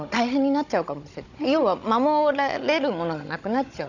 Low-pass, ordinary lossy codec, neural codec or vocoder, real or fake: 7.2 kHz; none; codec, 16 kHz, 16 kbps, FunCodec, trained on Chinese and English, 50 frames a second; fake